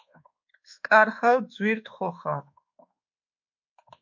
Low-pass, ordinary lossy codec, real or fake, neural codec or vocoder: 7.2 kHz; MP3, 48 kbps; fake; codec, 24 kHz, 1.2 kbps, DualCodec